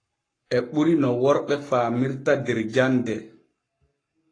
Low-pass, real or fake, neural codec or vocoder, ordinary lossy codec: 9.9 kHz; fake; codec, 44.1 kHz, 7.8 kbps, Pupu-Codec; AAC, 32 kbps